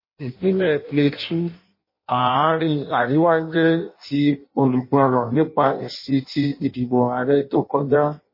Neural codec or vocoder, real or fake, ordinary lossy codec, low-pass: codec, 16 kHz in and 24 kHz out, 0.6 kbps, FireRedTTS-2 codec; fake; MP3, 24 kbps; 5.4 kHz